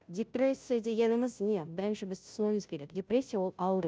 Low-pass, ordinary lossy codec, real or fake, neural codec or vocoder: none; none; fake; codec, 16 kHz, 0.5 kbps, FunCodec, trained on Chinese and English, 25 frames a second